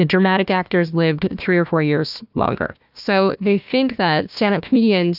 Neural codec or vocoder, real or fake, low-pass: codec, 16 kHz, 1 kbps, FunCodec, trained on Chinese and English, 50 frames a second; fake; 5.4 kHz